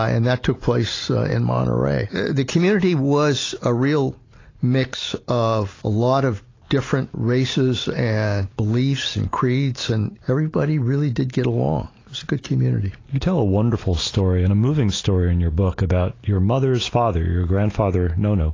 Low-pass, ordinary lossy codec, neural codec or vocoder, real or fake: 7.2 kHz; AAC, 32 kbps; none; real